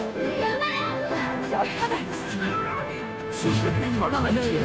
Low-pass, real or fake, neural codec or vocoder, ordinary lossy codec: none; fake; codec, 16 kHz, 0.5 kbps, FunCodec, trained on Chinese and English, 25 frames a second; none